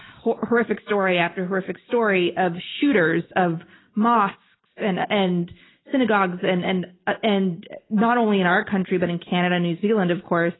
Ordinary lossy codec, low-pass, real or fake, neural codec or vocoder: AAC, 16 kbps; 7.2 kHz; real; none